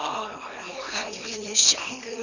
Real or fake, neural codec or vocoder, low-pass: fake; codec, 24 kHz, 0.9 kbps, WavTokenizer, small release; 7.2 kHz